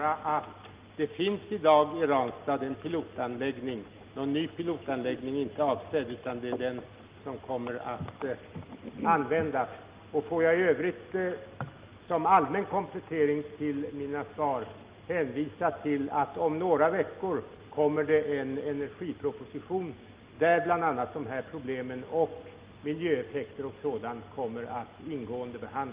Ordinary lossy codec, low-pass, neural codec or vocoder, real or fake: Opus, 32 kbps; 3.6 kHz; none; real